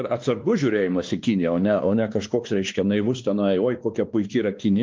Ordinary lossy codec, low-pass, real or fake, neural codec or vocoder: Opus, 32 kbps; 7.2 kHz; fake; codec, 16 kHz, 2 kbps, X-Codec, WavLM features, trained on Multilingual LibriSpeech